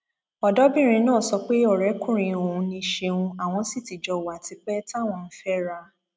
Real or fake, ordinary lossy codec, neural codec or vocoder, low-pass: real; none; none; none